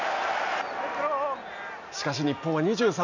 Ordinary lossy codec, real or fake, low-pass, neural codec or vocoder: none; real; 7.2 kHz; none